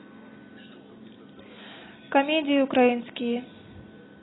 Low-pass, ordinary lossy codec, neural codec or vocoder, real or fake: 7.2 kHz; AAC, 16 kbps; none; real